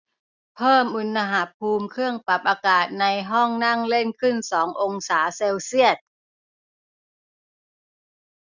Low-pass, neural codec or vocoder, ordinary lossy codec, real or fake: 7.2 kHz; none; none; real